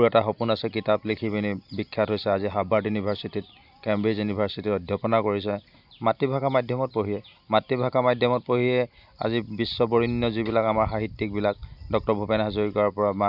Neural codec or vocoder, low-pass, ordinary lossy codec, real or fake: none; 5.4 kHz; none; real